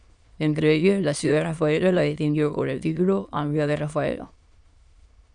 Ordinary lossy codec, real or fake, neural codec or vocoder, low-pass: Opus, 64 kbps; fake; autoencoder, 22.05 kHz, a latent of 192 numbers a frame, VITS, trained on many speakers; 9.9 kHz